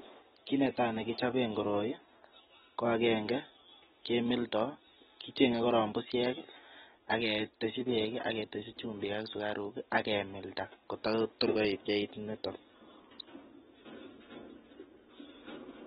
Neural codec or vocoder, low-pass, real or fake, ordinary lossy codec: none; 10.8 kHz; real; AAC, 16 kbps